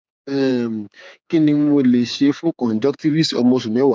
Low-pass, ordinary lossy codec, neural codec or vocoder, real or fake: none; none; codec, 16 kHz, 4 kbps, X-Codec, HuBERT features, trained on general audio; fake